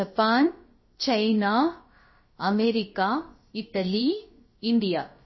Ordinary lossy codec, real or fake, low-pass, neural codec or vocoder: MP3, 24 kbps; fake; 7.2 kHz; codec, 16 kHz, about 1 kbps, DyCAST, with the encoder's durations